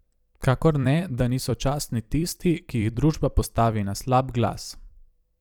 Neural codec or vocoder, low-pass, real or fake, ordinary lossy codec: vocoder, 44.1 kHz, 128 mel bands every 256 samples, BigVGAN v2; 19.8 kHz; fake; none